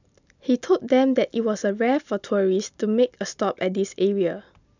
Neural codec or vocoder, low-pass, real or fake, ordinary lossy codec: none; 7.2 kHz; real; none